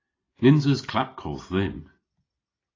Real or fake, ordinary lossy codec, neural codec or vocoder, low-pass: real; AAC, 32 kbps; none; 7.2 kHz